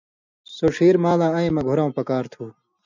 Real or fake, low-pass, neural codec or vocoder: real; 7.2 kHz; none